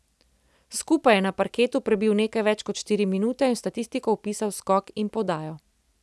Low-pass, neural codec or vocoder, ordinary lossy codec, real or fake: none; none; none; real